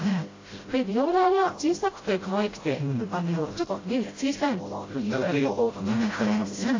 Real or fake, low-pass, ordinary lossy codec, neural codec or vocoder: fake; 7.2 kHz; AAC, 32 kbps; codec, 16 kHz, 0.5 kbps, FreqCodec, smaller model